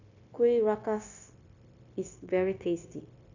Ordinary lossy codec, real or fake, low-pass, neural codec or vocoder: none; fake; 7.2 kHz; codec, 16 kHz, 0.9 kbps, LongCat-Audio-Codec